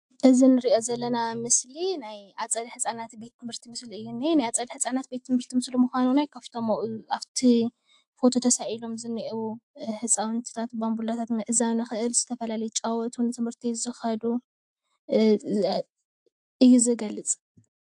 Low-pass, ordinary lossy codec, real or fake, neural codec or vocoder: 10.8 kHz; AAC, 64 kbps; fake; autoencoder, 48 kHz, 128 numbers a frame, DAC-VAE, trained on Japanese speech